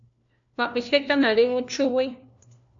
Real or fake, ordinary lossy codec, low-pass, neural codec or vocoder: fake; AAC, 64 kbps; 7.2 kHz; codec, 16 kHz, 1 kbps, FunCodec, trained on LibriTTS, 50 frames a second